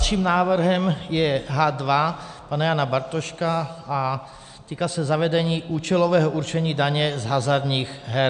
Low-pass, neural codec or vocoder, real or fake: 9.9 kHz; none; real